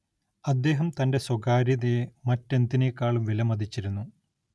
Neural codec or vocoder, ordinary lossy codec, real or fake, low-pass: none; none; real; none